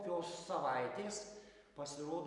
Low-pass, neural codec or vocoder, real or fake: 10.8 kHz; none; real